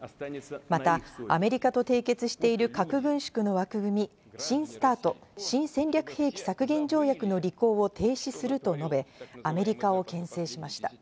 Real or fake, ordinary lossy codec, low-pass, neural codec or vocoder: real; none; none; none